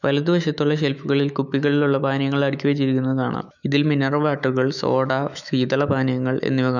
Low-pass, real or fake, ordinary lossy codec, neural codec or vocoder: 7.2 kHz; fake; none; autoencoder, 48 kHz, 128 numbers a frame, DAC-VAE, trained on Japanese speech